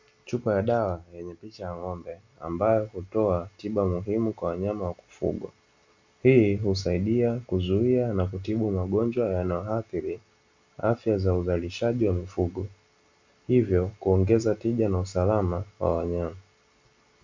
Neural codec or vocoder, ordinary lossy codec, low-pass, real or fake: none; MP3, 64 kbps; 7.2 kHz; real